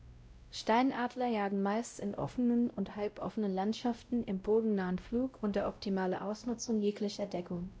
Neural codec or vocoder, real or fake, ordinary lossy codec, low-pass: codec, 16 kHz, 0.5 kbps, X-Codec, WavLM features, trained on Multilingual LibriSpeech; fake; none; none